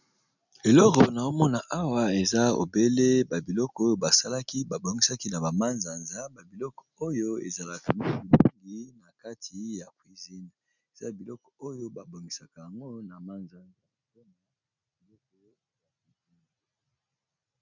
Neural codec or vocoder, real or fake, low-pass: none; real; 7.2 kHz